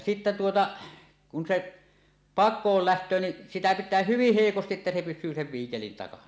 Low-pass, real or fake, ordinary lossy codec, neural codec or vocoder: none; real; none; none